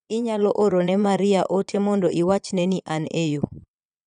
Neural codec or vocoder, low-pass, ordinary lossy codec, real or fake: vocoder, 22.05 kHz, 80 mel bands, Vocos; 9.9 kHz; none; fake